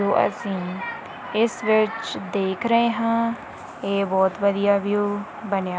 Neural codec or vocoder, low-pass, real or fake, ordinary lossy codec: none; none; real; none